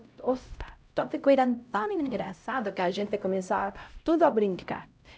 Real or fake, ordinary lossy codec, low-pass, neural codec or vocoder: fake; none; none; codec, 16 kHz, 0.5 kbps, X-Codec, HuBERT features, trained on LibriSpeech